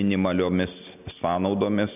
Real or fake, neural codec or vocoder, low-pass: real; none; 3.6 kHz